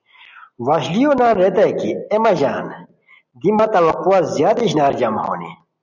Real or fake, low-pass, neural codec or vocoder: real; 7.2 kHz; none